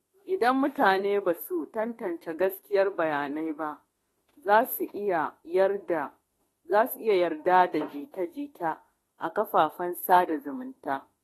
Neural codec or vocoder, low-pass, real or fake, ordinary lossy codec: autoencoder, 48 kHz, 32 numbers a frame, DAC-VAE, trained on Japanese speech; 19.8 kHz; fake; AAC, 32 kbps